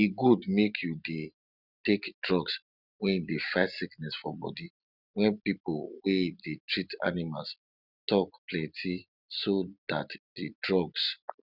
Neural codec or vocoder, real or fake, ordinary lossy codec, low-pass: none; real; none; 5.4 kHz